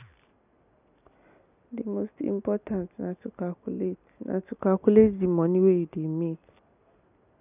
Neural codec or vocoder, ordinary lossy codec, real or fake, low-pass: none; none; real; 3.6 kHz